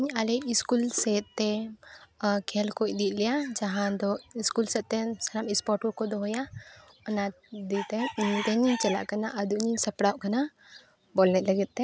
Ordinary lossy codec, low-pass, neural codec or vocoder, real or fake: none; none; none; real